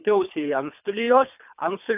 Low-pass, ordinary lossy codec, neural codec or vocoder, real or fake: 3.6 kHz; AAC, 32 kbps; codec, 24 kHz, 3 kbps, HILCodec; fake